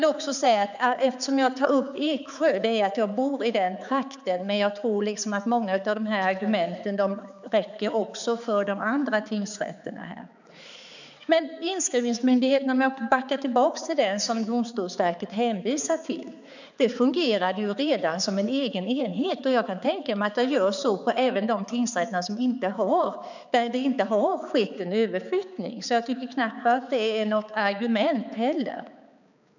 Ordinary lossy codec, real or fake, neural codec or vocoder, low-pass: none; fake; codec, 16 kHz, 4 kbps, X-Codec, HuBERT features, trained on balanced general audio; 7.2 kHz